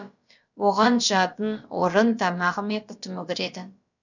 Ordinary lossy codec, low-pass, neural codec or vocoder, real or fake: none; 7.2 kHz; codec, 16 kHz, about 1 kbps, DyCAST, with the encoder's durations; fake